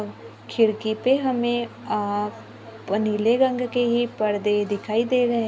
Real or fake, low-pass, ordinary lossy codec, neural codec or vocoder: real; none; none; none